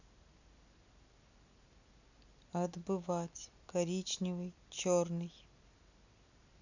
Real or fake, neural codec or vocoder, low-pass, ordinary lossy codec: real; none; 7.2 kHz; none